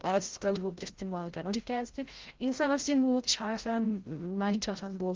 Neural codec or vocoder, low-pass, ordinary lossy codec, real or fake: codec, 16 kHz, 0.5 kbps, FreqCodec, larger model; 7.2 kHz; Opus, 16 kbps; fake